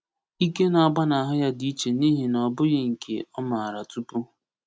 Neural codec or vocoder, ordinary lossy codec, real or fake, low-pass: none; none; real; none